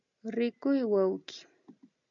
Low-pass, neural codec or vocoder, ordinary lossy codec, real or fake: 7.2 kHz; none; MP3, 64 kbps; real